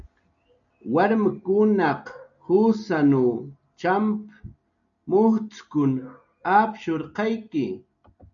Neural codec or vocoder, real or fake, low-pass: none; real; 7.2 kHz